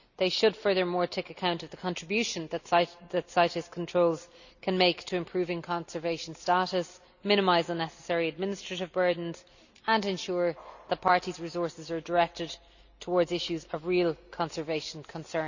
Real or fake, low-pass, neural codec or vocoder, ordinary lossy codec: real; 7.2 kHz; none; none